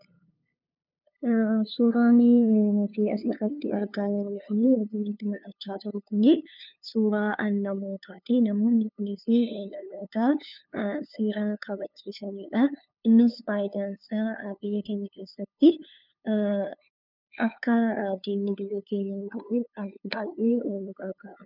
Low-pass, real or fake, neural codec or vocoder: 5.4 kHz; fake; codec, 16 kHz, 2 kbps, FunCodec, trained on LibriTTS, 25 frames a second